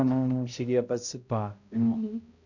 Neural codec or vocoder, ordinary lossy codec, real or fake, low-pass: codec, 16 kHz, 0.5 kbps, X-Codec, HuBERT features, trained on balanced general audio; none; fake; 7.2 kHz